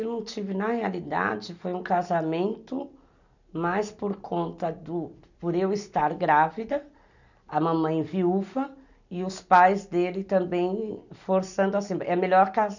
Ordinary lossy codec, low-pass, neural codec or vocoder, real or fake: none; 7.2 kHz; none; real